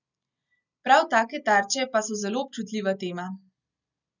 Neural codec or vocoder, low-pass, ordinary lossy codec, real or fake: none; 7.2 kHz; none; real